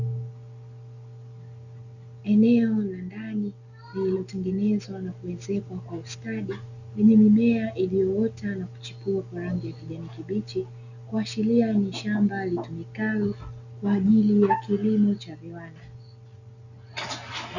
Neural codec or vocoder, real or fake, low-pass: none; real; 7.2 kHz